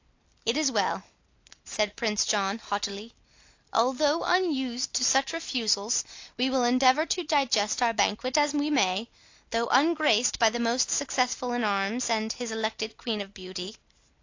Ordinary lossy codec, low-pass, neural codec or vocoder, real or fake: AAC, 48 kbps; 7.2 kHz; none; real